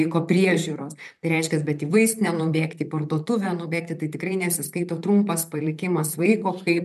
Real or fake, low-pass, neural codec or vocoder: fake; 14.4 kHz; vocoder, 44.1 kHz, 128 mel bands, Pupu-Vocoder